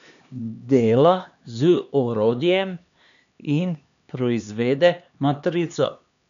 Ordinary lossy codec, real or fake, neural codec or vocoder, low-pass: none; fake; codec, 16 kHz, 2 kbps, X-Codec, HuBERT features, trained on LibriSpeech; 7.2 kHz